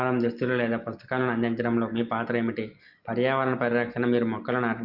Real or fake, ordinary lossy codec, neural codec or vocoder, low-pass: real; Opus, 32 kbps; none; 5.4 kHz